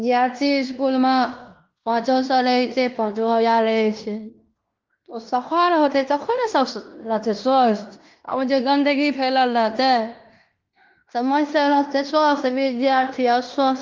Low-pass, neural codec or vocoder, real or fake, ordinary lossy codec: 7.2 kHz; codec, 16 kHz in and 24 kHz out, 0.9 kbps, LongCat-Audio-Codec, fine tuned four codebook decoder; fake; Opus, 32 kbps